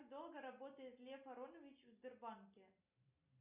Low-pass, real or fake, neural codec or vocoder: 3.6 kHz; real; none